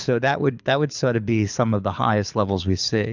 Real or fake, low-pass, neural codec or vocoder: fake; 7.2 kHz; codec, 24 kHz, 6 kbps, HILCodec